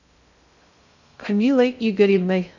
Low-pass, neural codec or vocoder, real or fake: 7.2 kHz; codec, 16 kHz in and 24 kHz out, 0.6 kbps, FocalCodec, streaming, 2048 codes; fake